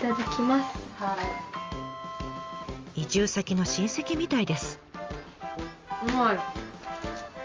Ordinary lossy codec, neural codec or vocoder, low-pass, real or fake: Opus, 32 kbps; none; 7.2 kHz; real